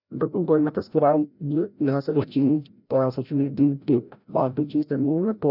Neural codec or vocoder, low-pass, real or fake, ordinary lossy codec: codec, 16 kHz, 0.5 kbps, FreqCodec, larger model; 5.4 kHz; fake; none